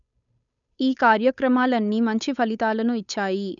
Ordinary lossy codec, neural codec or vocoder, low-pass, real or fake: none; codec, 16 kHz, 8 kbps, FunCodec, trained on Chinese and English, 25 frames a second; 7.2 kHz; fake